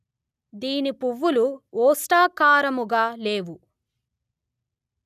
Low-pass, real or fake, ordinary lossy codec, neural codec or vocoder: 14.4 kHz; real; none; none